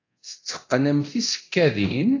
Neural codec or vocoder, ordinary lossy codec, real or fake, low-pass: codec, 24 kHz, 0.9 kbps, DualCodec; AAC, 32 kbps; fake; 7.2 kHz